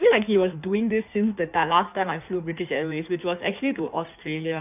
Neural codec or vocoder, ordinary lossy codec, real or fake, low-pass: codec, 16 kHz in and 24 kHz out, 1.1 kbps, FireRedTTS-2 codec; none; fake; 3.6 kHz